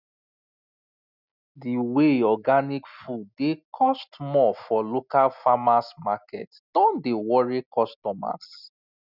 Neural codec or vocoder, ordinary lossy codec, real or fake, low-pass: none; none; real; 5.4 kHz